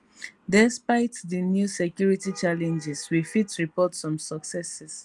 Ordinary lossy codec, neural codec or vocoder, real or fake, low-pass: Opus, 32 kbps; none; real; 10.8 kHz